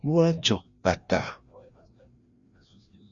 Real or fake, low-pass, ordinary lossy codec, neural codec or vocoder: fake; 7.2 kHz; Opus, 64 kbps; codec, 16 kHz, 1 kbps, FreqCodec, larger model